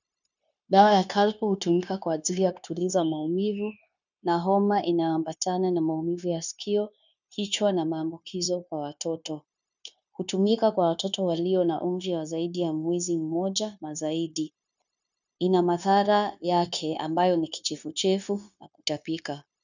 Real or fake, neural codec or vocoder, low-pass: fake; codec, 16 kHz, 0.9 kbps, LongCat-Audio-Codec; 7.2 kHz